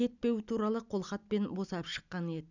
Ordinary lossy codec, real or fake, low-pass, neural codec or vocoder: none; real; 7.2 kHz; none